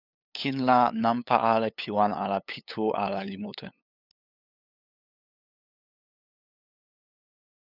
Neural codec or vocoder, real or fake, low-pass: codec, 16 kHz, 8 kbps, FunCodec, trained on LibriTTS, 25 frames a second; fake; 5.4 kHz